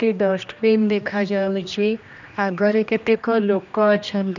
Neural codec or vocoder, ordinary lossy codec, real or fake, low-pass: codec, 16 kHz, 1 kbps, X-Codec, HuBERT features, trained on general audio; none; fake; 7.2 kHz